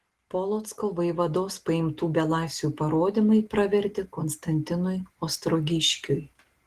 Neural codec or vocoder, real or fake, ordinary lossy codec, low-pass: none; real; Opus, 16 kbps; 14.4 kHz